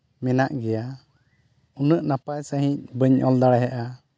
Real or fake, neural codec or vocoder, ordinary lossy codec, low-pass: real; none; none; none